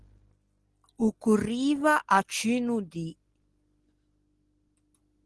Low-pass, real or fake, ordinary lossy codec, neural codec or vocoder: 10.8 kHz; real; Opus, 16 kbps; none